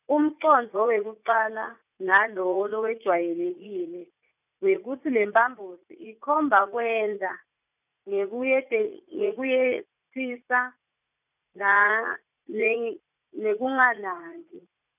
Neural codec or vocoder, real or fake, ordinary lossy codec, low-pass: vocoder, 44.1 kHz, 80 mel bands, Vocos; fake; none; 3.6 kHz